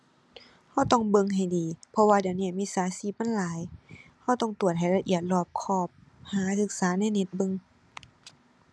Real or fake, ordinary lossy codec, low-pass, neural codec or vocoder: real; none; none; none